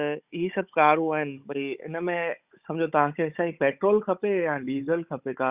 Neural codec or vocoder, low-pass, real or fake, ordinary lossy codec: codec, 16 kHz, 16 kbps, FunCodec, trained on Chinese and English, 50 frames a second; 3.6 kHz; fake; Opus, 64 kbps